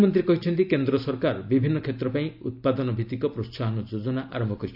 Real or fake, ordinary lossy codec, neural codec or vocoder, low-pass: real; none; none; 5.4 kHz